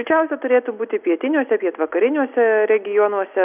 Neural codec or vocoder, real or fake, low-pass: none; real; 3.6 kHz